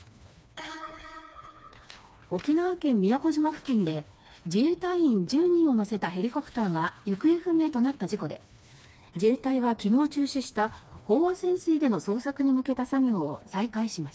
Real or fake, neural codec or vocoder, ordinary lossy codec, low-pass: fake; codec, 16 kHz, 2 kbps, FreqCodec, smaller model; none; none